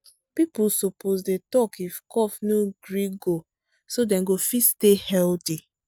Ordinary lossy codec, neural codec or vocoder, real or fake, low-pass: none; none; real; none